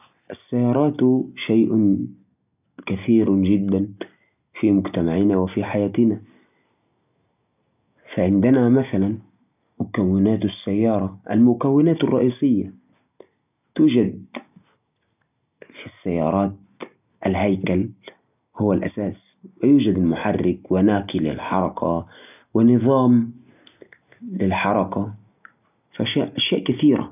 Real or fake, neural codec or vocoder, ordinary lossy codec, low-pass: real; none; none; 3.6 kHz